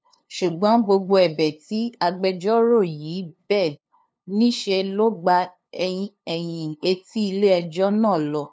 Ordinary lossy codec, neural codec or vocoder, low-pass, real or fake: none; codec, 16 kHz, 2 kbps, FunCodec, trained on LibriTTS, 25 frames a second; none; fake